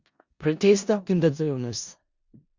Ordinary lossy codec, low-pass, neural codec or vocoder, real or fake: Opus, 64 kbps; 7.2 kHz; codec, 16 kHz in and 24 kHz out, 0.4 kbps, LongCat-Audio-Codec, four codebook decoder; fake